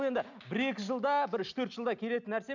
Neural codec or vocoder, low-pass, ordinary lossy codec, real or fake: none; 7.2 kHz; none; real